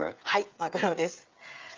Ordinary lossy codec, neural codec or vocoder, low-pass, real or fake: Opus, 32 kbps; codec, 16 kHz, 16 kbps, FreqCodec, smaller model; 7.2 kHz; fake